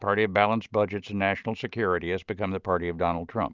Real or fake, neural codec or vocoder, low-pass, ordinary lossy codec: real; none; 7.2 kHz; Opus, 24 kbps